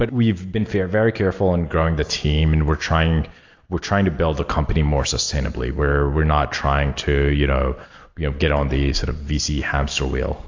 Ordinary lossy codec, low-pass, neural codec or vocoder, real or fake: AAC, 48 kbps; 7.2 kHz; none; real